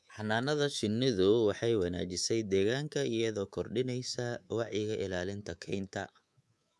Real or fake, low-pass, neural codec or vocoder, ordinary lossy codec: fake; none; codec, 24 kHz, 3.1 kbps, DualCodec; none